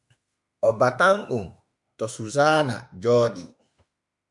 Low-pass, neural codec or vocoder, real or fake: 10.8 kHz; autoencoder, 48 kHz, 32 numbers a frame, DAC-VAE, trained on Japanese speech; fake